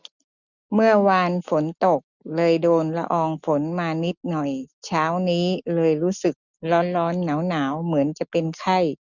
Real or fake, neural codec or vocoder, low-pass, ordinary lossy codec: real; none; 7.2 kHz; none